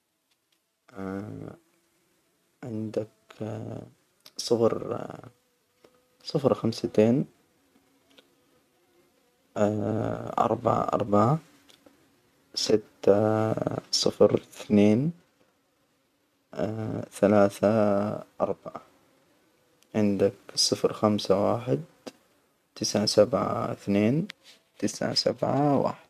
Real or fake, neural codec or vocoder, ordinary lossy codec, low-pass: real; none; none; 14.4 kHz